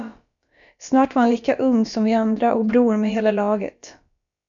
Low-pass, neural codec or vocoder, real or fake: 7.2 kHz; codec, 16 kHz, about 1 kbps, DyCAST, with the encoder's durations; fake